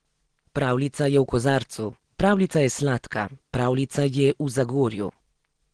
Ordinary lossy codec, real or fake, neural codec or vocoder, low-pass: Opus, 16 kbps; real; none; 9.9 kHz